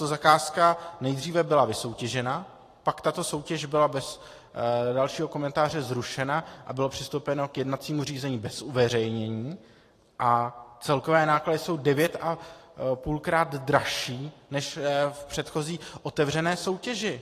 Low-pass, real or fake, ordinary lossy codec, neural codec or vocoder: 14.4 kHz; fake; AAC, 48 kbps; vocoder, 44.1 kHz, 128 mel bands every 512 samples, BigVGAN v2